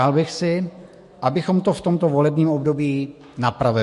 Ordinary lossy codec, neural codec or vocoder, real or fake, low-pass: MP3, 48 kbps; autoencoder, 48 kHz, 128 numbers a frame, DAC-VAE, trained on Japanese speech; fake; 14.4 kHz